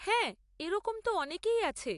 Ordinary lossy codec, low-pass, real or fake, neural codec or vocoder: AAC, 64 kbps; 10.8 kHz; real; none